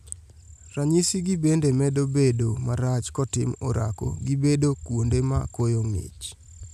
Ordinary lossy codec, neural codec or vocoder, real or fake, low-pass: none; none; real; 14.4 kHz